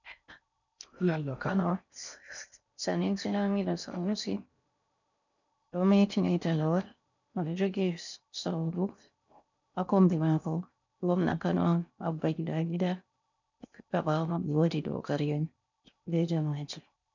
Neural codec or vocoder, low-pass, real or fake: codec, 16 kHz in and 24 kHz out, 0.6 kbps, FocalCodec, streaming, 4096 codes; 7.2 kHz; fake